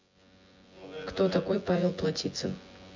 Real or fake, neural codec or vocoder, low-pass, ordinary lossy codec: fake; vocoder, 24 kHz, 100 mel bands, Vocos; 7.2 kHz; MP3, 48 kbps